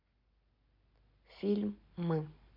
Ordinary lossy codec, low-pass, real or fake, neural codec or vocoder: MP3, 48 kbps; 5.4 kHz; real; none